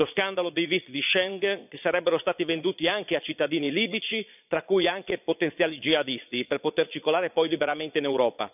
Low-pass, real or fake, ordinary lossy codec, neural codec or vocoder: 3.6 kHz; fake; none; vocoder, 22.05 kHz, 80 mel bands, Vocos